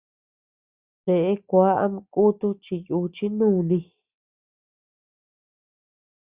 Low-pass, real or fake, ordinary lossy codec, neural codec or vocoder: 3.6 kHz; real; Opus, 64 kbps; none